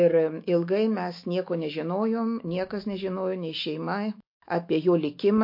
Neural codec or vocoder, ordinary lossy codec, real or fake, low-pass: autoencoder, 48 kHz, 128 numbers a frame, DAC-VAE, trained on Japanese speech; MP3, 32 kbps; fake; 5.4 kHz